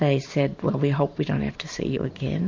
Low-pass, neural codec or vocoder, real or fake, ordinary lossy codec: 7.2 kHz; vocoder, 44.1 kHz, 128 mel bands every 512 samples, BigVGAN v2; fake; MP3, 48 kbps